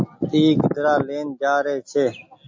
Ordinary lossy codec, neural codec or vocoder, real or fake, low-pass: MP3, 48 kbps; none; real; 7.2 kHz